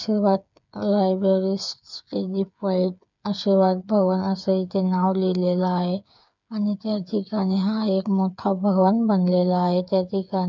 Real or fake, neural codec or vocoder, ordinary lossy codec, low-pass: fake; codec, 16 kHz, 8 kbps, FreqCodec, smaller model; none; 7.2 kHz